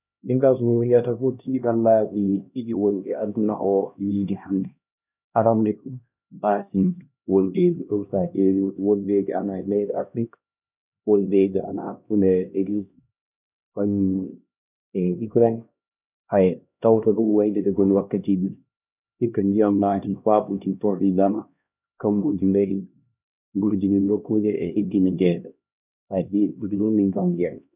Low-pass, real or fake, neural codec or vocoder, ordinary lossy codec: 3.6 kHz; fake; codec, 16 kHz, 1 kbps, X-Codec, HuBERT features, trained on LibriSpeech; none